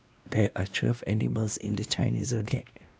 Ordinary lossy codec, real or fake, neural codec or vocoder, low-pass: none; fake; codec, 16 kHz, 1 kbps, X-Codec, WavLM features, trained on Multilingual LibriSpeech; none